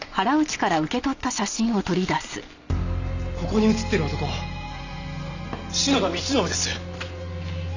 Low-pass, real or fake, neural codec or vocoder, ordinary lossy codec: 7.2 kHz; real; none; none